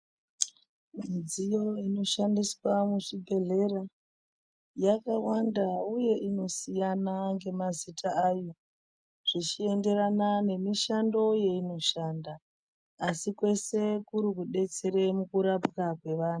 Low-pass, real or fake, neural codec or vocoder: 9.9 kHz; real; none